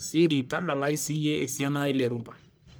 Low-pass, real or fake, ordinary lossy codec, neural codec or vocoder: none; fake; none; codec, 44.1 kHz, 1.7 kbps, Pupu-Codec